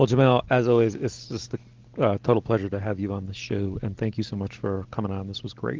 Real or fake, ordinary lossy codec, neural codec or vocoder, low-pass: real; Opus, 16 kbps; none; 7.2 kHz